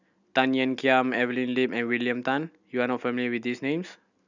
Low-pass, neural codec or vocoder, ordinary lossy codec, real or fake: 7.2 kHz; none; none; real